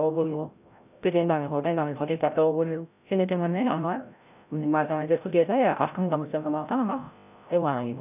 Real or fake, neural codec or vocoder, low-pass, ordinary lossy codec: fake; codec, 16 kHz, 0.5 kbps, FreqCodec, larger model; 3.6 kHz; none